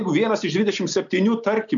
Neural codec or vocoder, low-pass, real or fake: none; 7.2 kHz; real